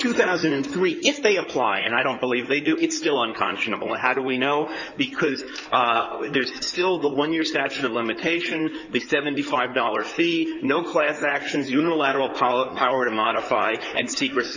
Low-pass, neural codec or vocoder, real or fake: 7.2 kHz; codec, 16 kHz in and 24 kHz out, 2.2 kbps, FireRedTTS-2 codec; fake